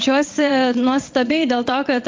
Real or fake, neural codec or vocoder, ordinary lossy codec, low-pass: fake; vocoder, 22.05 kHz, 80 mel bands, WaveNeXt; Opus, 32 kbps; 7.2 kHz